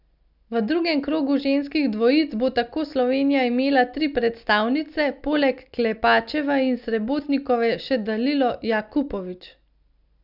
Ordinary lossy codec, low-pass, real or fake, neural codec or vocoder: none; 5.4 kHz; real; none